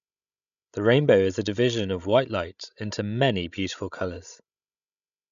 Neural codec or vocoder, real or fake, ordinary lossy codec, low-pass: codec, 16 kHz, 16 kbps, FreqCodec, larger model; fake; none; 7.2 kHz